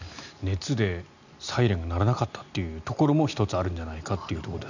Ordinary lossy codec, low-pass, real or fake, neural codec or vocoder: none; 7.2 kHz; real; none